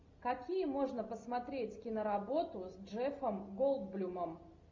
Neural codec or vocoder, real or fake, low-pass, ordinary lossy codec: none; real; 7.2 kHz; MP3, 64 kbps